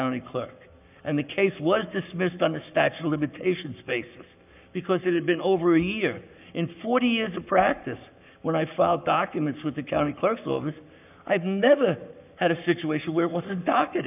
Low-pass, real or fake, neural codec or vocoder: 3.6 kHz; fake; codec, 44.1 kHz, 7.8 kbps, Pupu-Codec